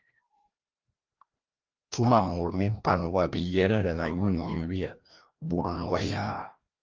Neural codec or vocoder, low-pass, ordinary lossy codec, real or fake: codec, 16 kHz, 1 kbps, FreqCodec, larger model; 7.2 kHz; Opus, 32 kbps; fake